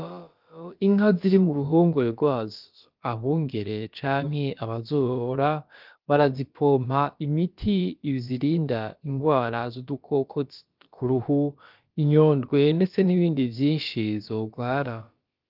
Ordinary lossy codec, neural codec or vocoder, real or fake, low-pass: Opus, 32 kbps; codec, 16 kHz, about 1 kbps, DyCAST, with the encoder's durations; fake; 5.4 kHz